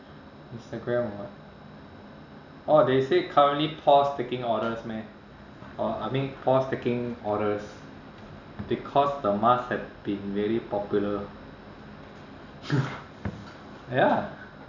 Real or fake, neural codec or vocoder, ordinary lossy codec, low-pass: real; none; none; 7.2 kHz